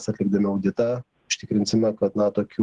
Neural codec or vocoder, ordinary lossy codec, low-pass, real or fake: none; Opus, 16 kbps; 10.8 kHz; real